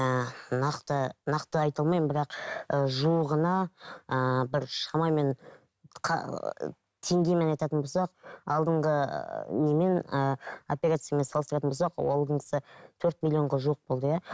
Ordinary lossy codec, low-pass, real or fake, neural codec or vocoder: none; none; real; none